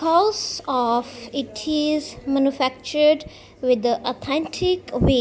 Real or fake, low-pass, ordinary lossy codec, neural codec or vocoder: real; none; none; none